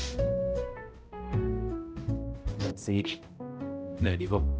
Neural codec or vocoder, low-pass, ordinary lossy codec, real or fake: codec, 16 kHz, 0.5 kbps, X-Codec, HuBERT features, trained on balanced general audio; none; none; fake